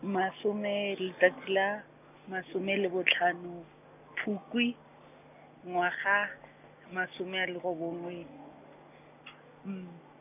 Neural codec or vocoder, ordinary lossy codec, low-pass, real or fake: none; none; 3.6 kHz; real